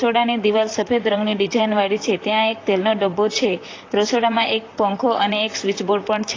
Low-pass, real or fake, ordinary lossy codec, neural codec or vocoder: 7.2 kHz; fake; AAC, 32 kbps; vocoder, 44.1 kHz, 128 mel bands, Pupu-Vocoder